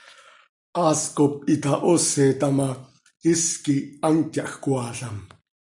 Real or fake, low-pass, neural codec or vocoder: real; 10.8 kHz; none